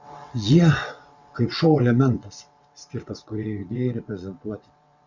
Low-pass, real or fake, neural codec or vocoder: 7.2 kHz; fake; vocoder, 22.05 kHz, 80 mel bands, WaveNeXt